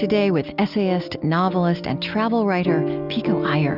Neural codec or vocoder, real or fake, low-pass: none; real; 5.4 kHz